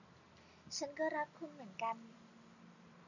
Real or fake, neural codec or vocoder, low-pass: real; none; 7.2 kHz